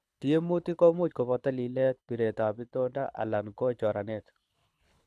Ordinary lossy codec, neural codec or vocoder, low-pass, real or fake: none; codec, 24 kHz, 6 kbps, HILCodec; none; fake